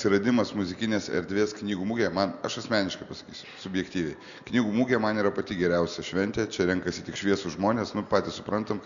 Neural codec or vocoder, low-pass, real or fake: none; 7.2 kHz; real